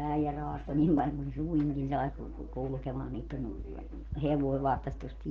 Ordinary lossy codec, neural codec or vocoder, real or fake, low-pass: Opus, 16 kbps; none; real; 7.2 kHz